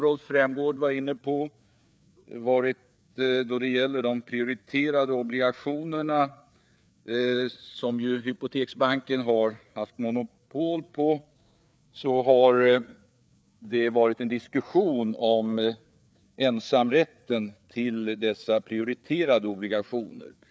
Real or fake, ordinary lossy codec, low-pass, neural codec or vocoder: fake; none; none; codec, 16 kHz, 4 kbps, FreqCodec, larger model